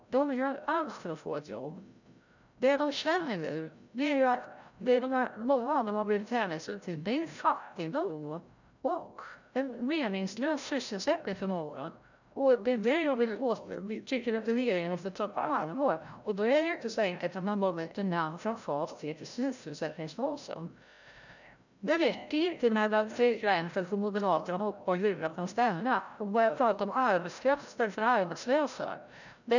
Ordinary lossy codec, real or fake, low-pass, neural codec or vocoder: none; fake; 7.2 kHz; codec, 16 kHz, 0.5 kbps, FreqCodec, larger model